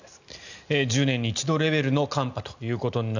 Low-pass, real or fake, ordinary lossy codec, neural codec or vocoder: 7.2 kHz; real; none; none